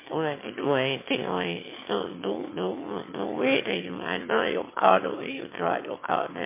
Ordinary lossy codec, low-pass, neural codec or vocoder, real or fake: MP3, 24 kbps; 3.6 kHz; autoencoder, 22.05 kHz, a latent of 192 numbers a frame, VITS, trained on one speaker; fake